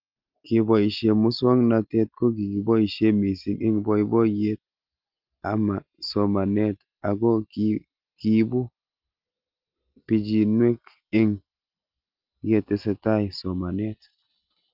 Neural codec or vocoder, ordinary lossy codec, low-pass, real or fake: none; Opus, 32 kbps; 5.4 kHz; real